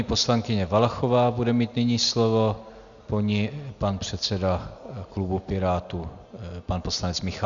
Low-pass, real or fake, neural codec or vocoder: 7.2 kHz; real; none